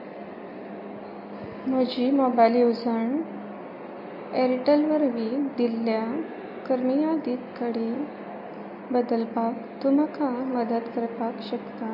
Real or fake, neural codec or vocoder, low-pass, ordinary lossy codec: real; none; 5.4 kHz; MP3, 32 kbps